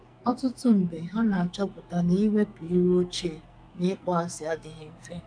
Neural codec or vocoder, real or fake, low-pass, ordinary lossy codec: codec, 44.1 kHz, 2.6 kbps, SNAC; fake; 9.9 kHz; none